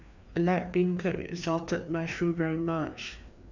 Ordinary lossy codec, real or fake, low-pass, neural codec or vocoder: none; fake; 7.2 kHz; codec, 16 kHz, 2 kbps, FreqCodec, larger model